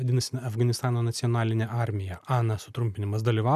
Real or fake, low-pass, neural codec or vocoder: real; 14.4 kHz; none